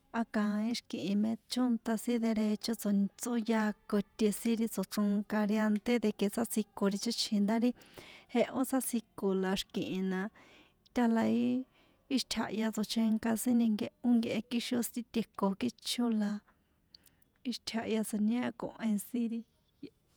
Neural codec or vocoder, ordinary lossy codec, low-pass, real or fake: vocoder, 48 kHz, 128 mel bands, Vocos; none; none; fake